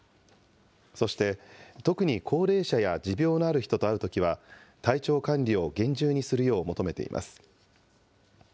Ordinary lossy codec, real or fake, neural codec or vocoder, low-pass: none; real; none; none